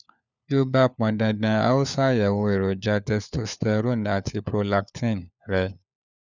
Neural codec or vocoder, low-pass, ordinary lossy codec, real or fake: codec, 16 kHz, 4 kbps, FunCodec, trained on LibriTTS, 50 frames a second; 7.2 kHz; none; fake